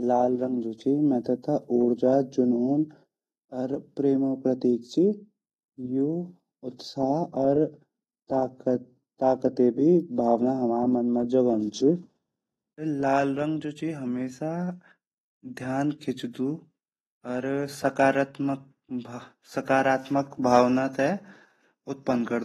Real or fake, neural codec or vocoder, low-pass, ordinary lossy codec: real; none; 19.8 kHz; AAC, 32 kbps